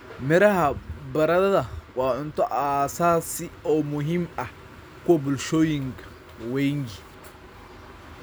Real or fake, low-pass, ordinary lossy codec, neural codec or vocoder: real; none; none; none